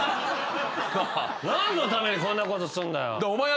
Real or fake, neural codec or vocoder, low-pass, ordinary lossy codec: real; none; none; none